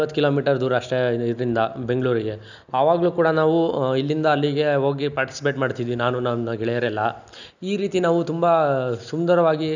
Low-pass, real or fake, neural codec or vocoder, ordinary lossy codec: 7.2 kHz; real; none; none